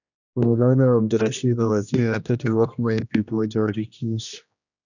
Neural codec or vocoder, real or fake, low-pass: codec, 16 kHz, 1 kbps, X-Codec, HuBERT features, trained on general audio; fake; 7.2 kHz